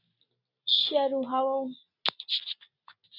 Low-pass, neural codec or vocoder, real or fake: 5.4 kHz; none; real